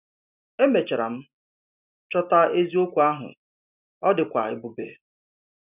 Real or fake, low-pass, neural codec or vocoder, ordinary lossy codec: real; 3.6 kHz; none; none